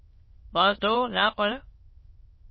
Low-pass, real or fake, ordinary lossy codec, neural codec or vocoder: 7.2 kHz; fake; MP3, 24 kbps; autoencoder, 22.05 kHz, a latent of 192 numbers a frame, VITS, trained on many speakers